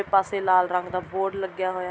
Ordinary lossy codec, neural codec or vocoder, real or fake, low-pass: none; none; real; none